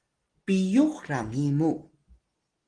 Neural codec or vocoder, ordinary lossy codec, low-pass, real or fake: none; Opus, 16 kbps; 9.9 kHz; real